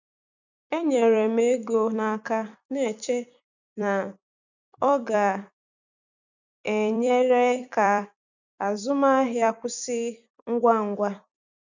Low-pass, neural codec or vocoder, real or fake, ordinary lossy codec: 7.2 kHz; vocoder, 44.1 kHz, 128 mel bands every 256 samples, BigVGAN v2; fake; none